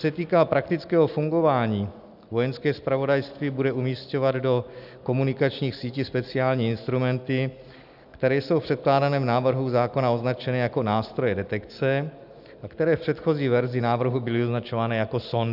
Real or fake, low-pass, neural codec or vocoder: real; 5.4 kHz; none